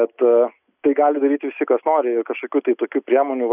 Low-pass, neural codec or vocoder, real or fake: 3.6 kHz; none; real